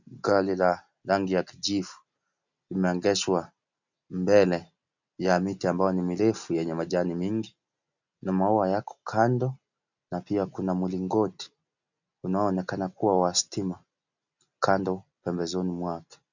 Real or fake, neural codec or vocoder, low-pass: real; none; 7.2 kHz